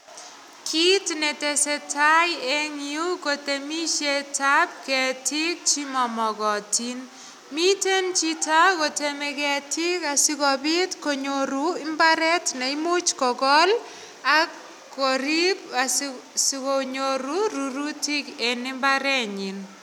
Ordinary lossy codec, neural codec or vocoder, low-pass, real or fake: none; none; 19.8 kHz; real